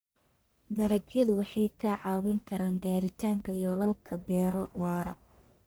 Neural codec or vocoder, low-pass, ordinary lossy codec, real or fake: codec, 44.1 kHz, 1.7 kbps, Pupu-Codec; none; none; fake